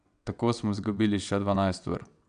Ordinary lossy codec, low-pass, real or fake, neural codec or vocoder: none; 9.9 kHz; fake; vocoder, 22.05 kHz, 80 mel bands, WaveNeXt